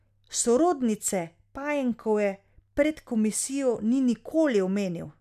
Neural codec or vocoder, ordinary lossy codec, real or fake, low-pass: none; none; real; 14.4 kHz